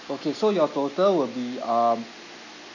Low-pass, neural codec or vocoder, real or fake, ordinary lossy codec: 7.2 kHz; none; real; none